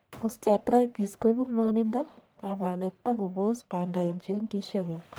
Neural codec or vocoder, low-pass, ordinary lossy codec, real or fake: codec, 44.1 kHz, 1.7 kbps, Pupu-Codec; none; none; fake